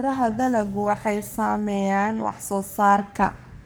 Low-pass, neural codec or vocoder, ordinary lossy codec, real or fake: none; codec, 44.1 kHz, 3.4 kbps, Pupu-Codec; none; fake